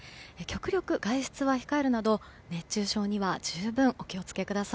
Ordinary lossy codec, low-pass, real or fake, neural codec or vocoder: none; none; real; none